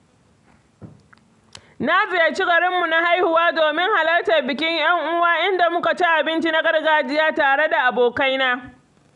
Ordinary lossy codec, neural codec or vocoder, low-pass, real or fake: none; none; 10.8 kHz; real